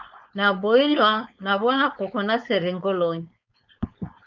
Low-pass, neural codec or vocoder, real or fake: 7.2 kHz; codec, 16 kHz, 4.8 kbps, FACodec; fake